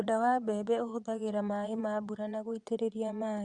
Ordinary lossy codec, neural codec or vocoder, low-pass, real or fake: Opus, 64 kbps; vocoder, 22.05 kHz, 80 mel bands, Vocos; 9.9 kHz; fake